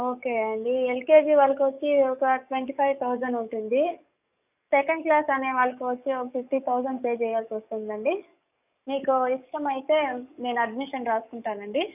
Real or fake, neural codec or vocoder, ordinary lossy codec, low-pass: fake; codec, 44.1 kHz, 7.8 kbps, DAC; none; 3.6 kHz